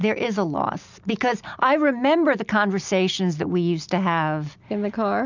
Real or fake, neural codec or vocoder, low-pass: real; none; 7.2 kHz